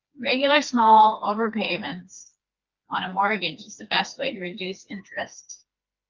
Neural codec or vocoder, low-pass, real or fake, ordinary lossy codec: codec, 16 kHz, 2 kbps, FreqCodec, smaller model; 7.2 kHz; fake; Opus, 24 kbps